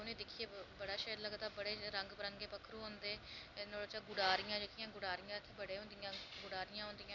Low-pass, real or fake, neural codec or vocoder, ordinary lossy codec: 7.2 kHz; real; none; none